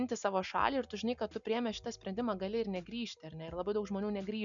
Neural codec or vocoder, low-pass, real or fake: none; 7.2 kHz; real